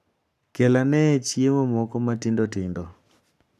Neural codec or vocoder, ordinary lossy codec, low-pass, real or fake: codec, 44.1 kHz, 7.8 kbps, Pupu-Codec; none; 14.4 kHz; fake